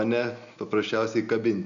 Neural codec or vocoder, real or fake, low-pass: none; real; 7.2 kHz